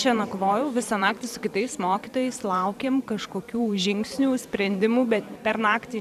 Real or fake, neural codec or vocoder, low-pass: fake; vocoder, 44.1 kHz, 128 mel bands every 512 samples, BigVGAN v2; 14.4 kHz